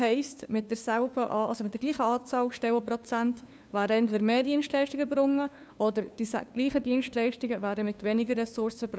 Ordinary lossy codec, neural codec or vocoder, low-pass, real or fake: none; codec, 16 kHz, 2 kbps, FunCodec, trained on LibriTTS, 25 frames a second; none; fake